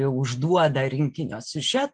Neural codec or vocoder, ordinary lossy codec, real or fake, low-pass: none; Opus, 32 kbps; real; 10.8 kHz